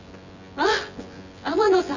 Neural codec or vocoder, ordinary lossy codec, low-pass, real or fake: vocoder, 24 kHz, 100 mel bands, Vocos; none; 7.2 kHz; fake